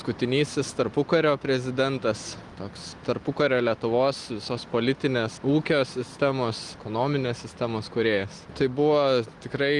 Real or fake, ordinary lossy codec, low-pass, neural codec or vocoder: real; Opus, 24 kbps; 10.8 kHz; none